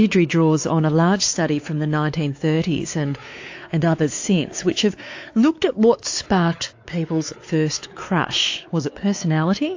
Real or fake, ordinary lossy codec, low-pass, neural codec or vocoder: fake; AAC, 48 kbps; 7.2 kHz; codec, 16 kHz, 4 kbps, X-Codec, WavLM features, trained on Multilingual LibriSpeech